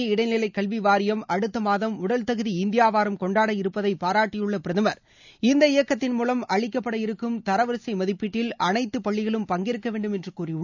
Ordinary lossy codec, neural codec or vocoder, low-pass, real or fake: none; none; 7.2 kHz; real